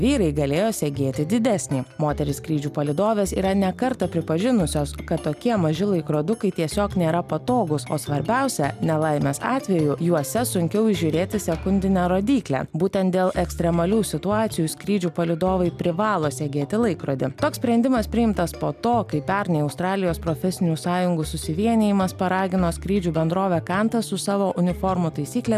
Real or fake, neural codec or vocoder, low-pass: real; none; 14.4 kHz